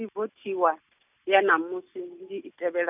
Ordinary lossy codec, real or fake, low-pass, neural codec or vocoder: none; real; 3.6 kHz; none